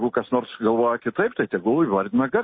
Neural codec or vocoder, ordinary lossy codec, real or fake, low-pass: none; MP3, 24 kbps; real; 7.2 kHz